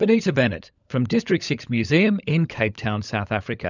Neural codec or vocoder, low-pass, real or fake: codec, 16 kHz, 16 kbps, FunCodec, trained on LibriTTS, 50 frames a second; 7.2 kHz; fake